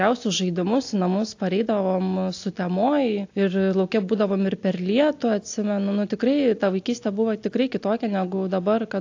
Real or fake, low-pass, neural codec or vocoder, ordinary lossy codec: real; 7.2 kHz; none; AAC, 48 kbps